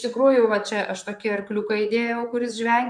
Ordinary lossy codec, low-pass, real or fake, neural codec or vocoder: MP3, 64 kbps; 9.9 kHz; fake; vocoder, 44.1 kHz, 128 mel bands, Pupu-Vocoder